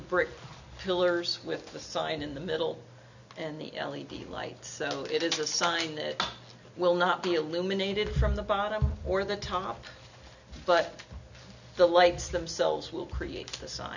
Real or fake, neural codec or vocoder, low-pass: real; none; 7.2 kHz